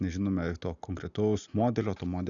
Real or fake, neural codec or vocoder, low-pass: real; none; 7.2 kHz